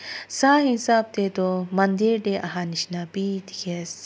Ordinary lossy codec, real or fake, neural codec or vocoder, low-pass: none; real; none; none